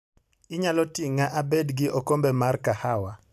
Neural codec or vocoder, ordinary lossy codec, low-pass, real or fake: none; none; 14.4 kHz; real